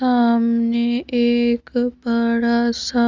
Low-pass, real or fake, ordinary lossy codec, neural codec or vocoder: 7.2 kHz; real; Opus, 32 kbps; none